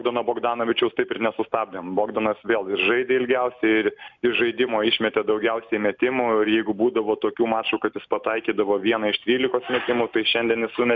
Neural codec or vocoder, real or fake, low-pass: none; real; 7.2 kHz